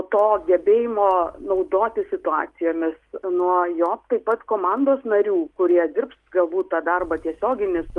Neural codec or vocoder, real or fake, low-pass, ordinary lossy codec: none; real; 10.8 kHz; Opus, 32 kbps